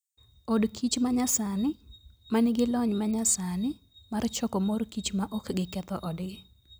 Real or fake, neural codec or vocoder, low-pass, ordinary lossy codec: real; none; none; none